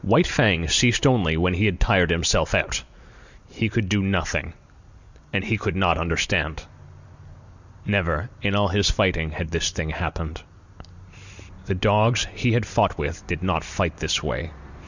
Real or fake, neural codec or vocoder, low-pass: real; none; 7.2 kHz